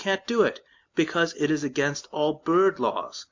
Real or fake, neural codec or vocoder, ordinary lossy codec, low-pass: real; none; MP3, 64 kbps; 7.2 kHz